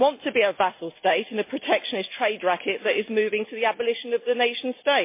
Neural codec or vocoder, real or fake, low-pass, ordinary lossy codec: none; real; 3.6 kHz; MP3, 24 kbps